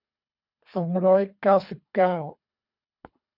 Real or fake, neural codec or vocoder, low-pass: fake; codec, 24 kHz, 3 kbps, HILCodec; 5.4 kHz